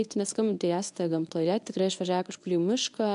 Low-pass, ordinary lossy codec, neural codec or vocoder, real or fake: 10.8 kHz; AAC, 96 kbps; codec, 24 kHz, 0.9 kbps, WavTokenizer, medium speech release version 1; fake